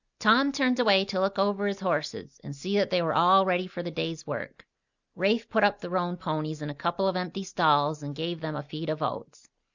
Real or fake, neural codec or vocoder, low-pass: real; none; 7.2 kHz